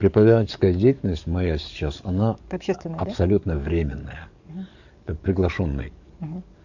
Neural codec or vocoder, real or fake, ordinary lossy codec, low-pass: codec, 44.1 kHz, 7.8 kbps, DAC; fake; none; 7.2 kHz